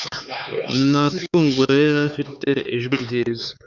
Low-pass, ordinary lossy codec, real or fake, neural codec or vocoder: 7.2 kHz; Opus, 64 kbps; fake; codec, 16 kHz, 4 kbps, X-Codec, HuBERT features, trained on LibriSpeech